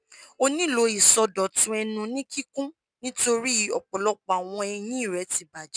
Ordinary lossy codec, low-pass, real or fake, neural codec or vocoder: none; 9.9 kHz; real; none